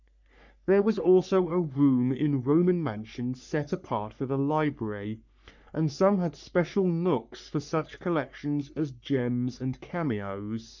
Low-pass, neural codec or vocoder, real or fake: 7.2 kHz; codec, 44.1 kHz, 3.4 kbps, Pupu-Codec; fake